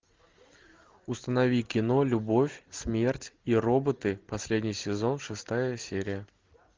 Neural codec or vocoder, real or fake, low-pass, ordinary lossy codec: none; real; 7.2 kHz; Opus, 32 kbps